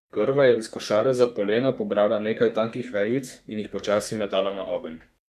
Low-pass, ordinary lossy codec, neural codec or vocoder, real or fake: 14.4 kHz; none; codec, 32 kHz, 1.9 kbps, SNAC; fake